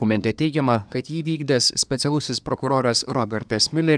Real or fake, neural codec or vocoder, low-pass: fake; codec, 24 kHz, 1 kbps, SNAC; 9.9 kHz